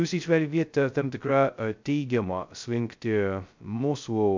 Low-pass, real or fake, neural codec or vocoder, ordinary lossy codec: 7.2 kHz; fake; codec, 16 kHz, 0.2 kbps, FocalCodec; MP3, 64 kbps